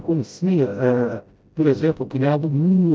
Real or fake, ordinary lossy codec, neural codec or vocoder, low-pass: fake; none; codec, 16 kHz, 0.5 kbps, FreqCodec, smaller model; none